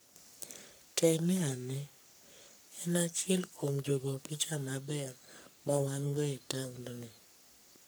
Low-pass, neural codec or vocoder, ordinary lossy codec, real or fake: none; codec, 44.1 kHz, 3.4 kbps, Pupu-Codec; none; fake